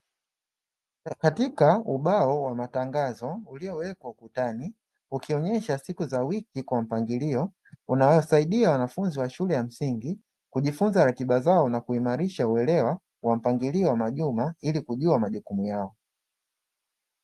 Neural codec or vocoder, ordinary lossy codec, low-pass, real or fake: none; Opus, 32 kbps; 14.4 kHz; real